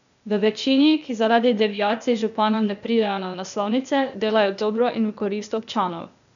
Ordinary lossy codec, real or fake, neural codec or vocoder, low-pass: none; fake; codec, 16 kHz, 0.8 kbps, ZipCodec; 7.2 kHz